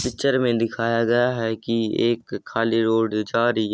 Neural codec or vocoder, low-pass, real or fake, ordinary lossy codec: none; none; real; none